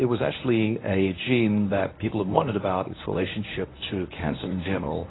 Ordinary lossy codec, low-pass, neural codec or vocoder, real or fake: AAC, 16 kbps; 7.2 kHz; codec, 24 kHz, 0.9 kbps, WavTokenizer, medium speech release version 1; fake